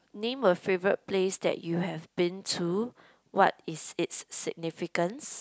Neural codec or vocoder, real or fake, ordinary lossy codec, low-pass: none; real; none; none